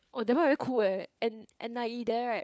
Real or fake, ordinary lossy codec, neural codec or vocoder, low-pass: fake; none; codec, 16 kHz, 4 kbps, FunCodec, trained on LibriTTS, 50 frames a second; none